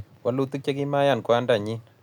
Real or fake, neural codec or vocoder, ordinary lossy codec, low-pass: real; none; none; 19.8 kHz